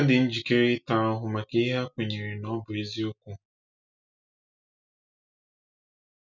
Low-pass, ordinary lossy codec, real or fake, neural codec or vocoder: 7.2 kHz; none; real; none